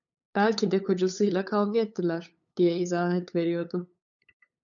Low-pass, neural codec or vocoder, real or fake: 7.2 kHz; codec, 16 kHz, 8 kbps, FunCodec, trained on LibriTTS, 25 frames a second; fake